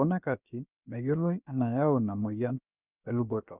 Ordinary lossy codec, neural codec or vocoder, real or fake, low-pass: none; codec, 16 kHz, about 1 kbps, DyCAST, with the encoder's durations; fake; 3.6 kHz